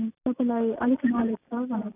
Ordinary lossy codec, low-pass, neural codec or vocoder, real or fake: none; 3.6 kHz; none; real